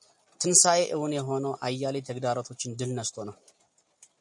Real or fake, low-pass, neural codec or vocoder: real; 10.8 kHz; none